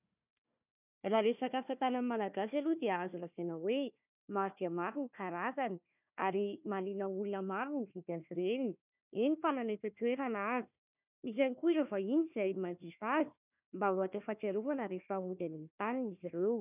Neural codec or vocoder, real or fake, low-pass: codec, 16 kHz, 1 kbps, FunCodec, trained on Chinese and English, 50 frames a second; fake; 3.6 kHz